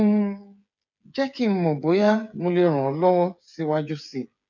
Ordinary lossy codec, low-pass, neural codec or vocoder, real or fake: none; 7.2 kHz; codec, 16 kHz, 8 kbps, FreqCodec, smaller model; fake